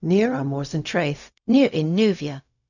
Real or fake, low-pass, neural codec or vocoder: fake; 7.2 kHz; codec, 16 kHz, 0.4 kbps, LongCat-Audio-Codec